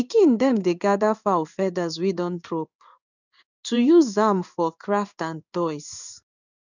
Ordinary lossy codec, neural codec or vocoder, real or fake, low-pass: none; codec, 16 kHz in and 24 kHz out, 1 kbps, XY-Tokenizer; fake; 7.2 kHz